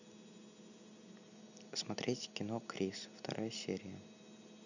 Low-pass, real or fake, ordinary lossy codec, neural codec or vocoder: 7.2 kHz; real; none; none